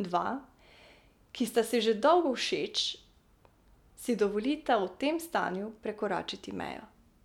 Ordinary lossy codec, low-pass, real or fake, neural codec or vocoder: none; 19.8 kHz; real; none